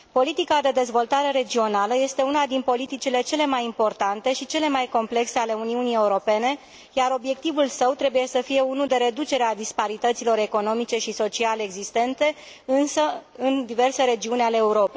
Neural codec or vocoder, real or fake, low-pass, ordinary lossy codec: none; real; none; none